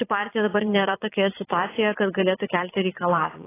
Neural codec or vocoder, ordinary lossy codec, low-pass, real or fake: vocoder, 22.05 kHz, 80 mel bands, Vocos; AAC, 16 kbps; 3.6 kHz; fake